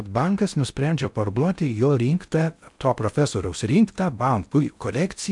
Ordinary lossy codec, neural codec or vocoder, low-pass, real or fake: MP3, 96 kbps; codec, 16 kHz in and 24 kHz out, 0.6 kbps, FocalCodec, streaming, 2048 codes; 10.8 kHz; fake